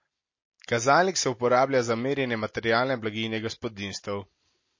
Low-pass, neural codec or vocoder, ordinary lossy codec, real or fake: 7.2 kHz; none; MP3, 32 kbps; real